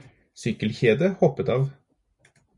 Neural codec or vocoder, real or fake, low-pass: none; real; 10.8 kHz